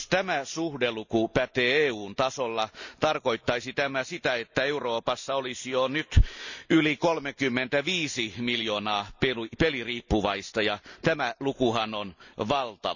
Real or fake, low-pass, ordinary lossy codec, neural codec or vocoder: real; 7.2 kHz; none; none